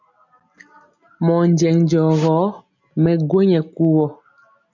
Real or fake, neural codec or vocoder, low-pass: real; none; 7.2 kHz